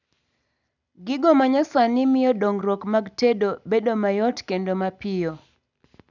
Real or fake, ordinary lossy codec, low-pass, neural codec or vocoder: real; none; 7.2 kHz; none